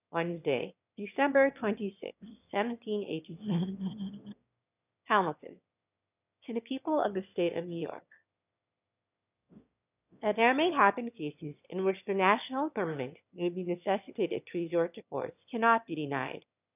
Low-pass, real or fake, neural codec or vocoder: 3.6 kHz; fake; autoencoder, 22.05 kHz, a latent of 192 numbers a frame, VITS, trained on one speaker